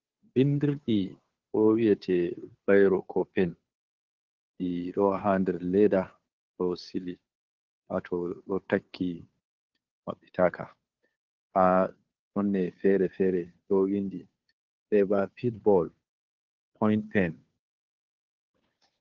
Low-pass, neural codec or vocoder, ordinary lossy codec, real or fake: 7.2 kHz; codec, 16 kHz, 2 kbps, FunCodec, trained on Chinese and English, 25 frames a second; Opus, 32 kbps; fake